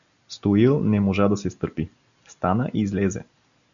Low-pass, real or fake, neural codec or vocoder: 7.2 kHz; real; none